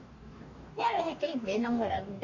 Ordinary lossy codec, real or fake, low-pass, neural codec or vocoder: AAC, 48 kbps; fake; 7.2 kHz; codec, 44.1 kHz, 2.6 kbps, DAC